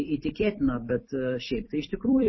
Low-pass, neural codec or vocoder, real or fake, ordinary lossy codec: 7.2 kHz; vocoder, 44.1 kHz, 128 mel bands every 256 samples, BigVGAN v2; fake; MP3, 24 kbps